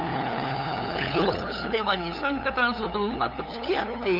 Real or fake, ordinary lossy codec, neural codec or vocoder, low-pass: fake; none; codec, 16 kHz, 8 kbps, FunCodec, trained on LibriTTS, 25 frames a second; 5.4 kHz